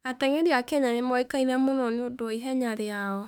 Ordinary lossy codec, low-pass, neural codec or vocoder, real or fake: none; 19.8 kHz; autoencoder, 48 kHz, 32 numbers a frame, DAC-VAE, trained on Japanese speech; fake